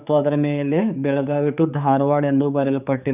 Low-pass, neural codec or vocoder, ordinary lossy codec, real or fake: 3.6 kHz; codec, 16 kHz, 4 kbps, X-Codec, HuBERT features, trained on general audio; none; fake